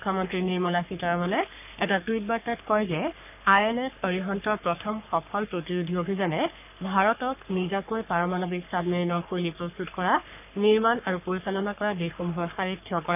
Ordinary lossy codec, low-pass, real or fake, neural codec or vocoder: none; 3.6 kHz; fake; codec, 44.1 kHz, 3.4 kbps, Pupu-Codec